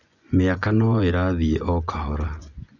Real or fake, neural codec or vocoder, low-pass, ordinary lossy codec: fake; vocoder, 24 kHz, 100 mel bands, Vocos; 7.2 kHz; none